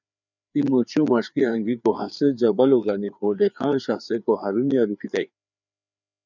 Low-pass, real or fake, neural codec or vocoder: 7.2 kHz; fake; codec, 16 kHz, 4 kbps, FreqCodec, larger model